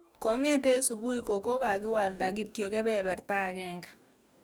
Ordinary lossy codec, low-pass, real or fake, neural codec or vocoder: none; none; fake; codec, 44.1 kHz, 2.6 kbps, DAC